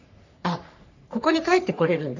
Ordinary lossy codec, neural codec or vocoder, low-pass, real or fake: none; codec, 44.1 kHz, 3.4 kbps, Pupu-Codec; 7.2 kHz; fake